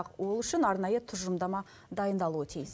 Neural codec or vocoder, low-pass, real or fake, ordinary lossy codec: none; none; real; none